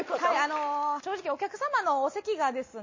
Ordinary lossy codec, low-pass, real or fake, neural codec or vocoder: MP3, 32 kbps; 7.2 kHz; real; none